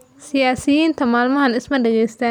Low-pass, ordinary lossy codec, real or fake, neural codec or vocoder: 19.8 kHz; none; real; none